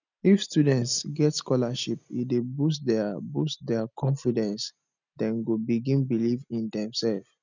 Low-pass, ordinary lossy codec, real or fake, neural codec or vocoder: 7.2 kHz; none; real; none